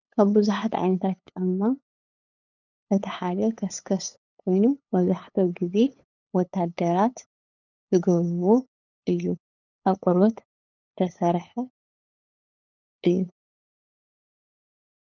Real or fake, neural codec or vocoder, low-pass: fake; codec, 16 kHz, 8 kbps, FunCodec, trained on LibriTTS, 25 frames a second; 7.2 kHz